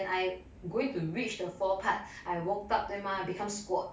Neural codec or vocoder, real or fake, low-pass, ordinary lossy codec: none; real; none; none